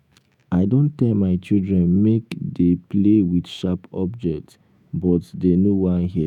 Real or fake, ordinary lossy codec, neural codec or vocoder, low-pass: fake; none; autoencoder, 48 kHz, 128 numbers a frame, DAC-VAE, trained on Japanese speech; 19.8 kHz